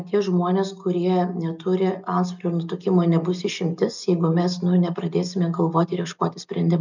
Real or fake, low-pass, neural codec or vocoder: real; 7.2 kHz; none